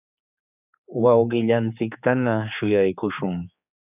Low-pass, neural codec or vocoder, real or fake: 3.6 kHz; codec, 16 kHz, 4 kbps, X-Codec, HuBERT features, trained on general audio; fake